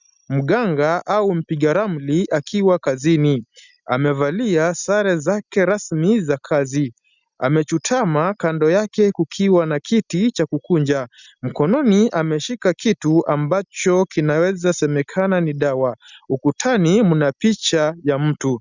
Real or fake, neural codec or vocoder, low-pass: real; none; 7.2 kHz